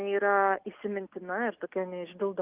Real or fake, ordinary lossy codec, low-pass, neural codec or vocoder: real; Opus, 32 kbps; 3.6 kHz; none